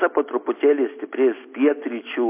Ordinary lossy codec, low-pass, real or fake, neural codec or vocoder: MP3, 24 kbps; 3.6 kHz; real; none